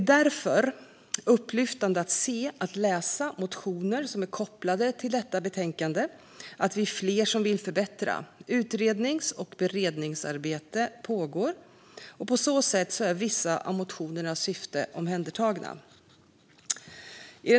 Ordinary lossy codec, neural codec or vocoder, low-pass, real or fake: none; none; none; real